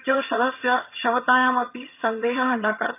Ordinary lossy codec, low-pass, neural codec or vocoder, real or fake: none; 3.6 kHz; vocoder, 22.05 kHz, 80 mel bands, HiFi-GAN; fake